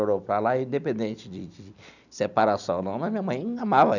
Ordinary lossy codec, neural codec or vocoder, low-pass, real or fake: none; none; 7.2 kHz; real